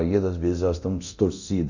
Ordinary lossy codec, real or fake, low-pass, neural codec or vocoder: none; fake; 7.2 kHz; codec, 24 kHz, 0.9 kbps, DualCodec